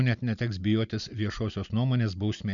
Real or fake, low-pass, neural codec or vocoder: real; 7.2 kHz; none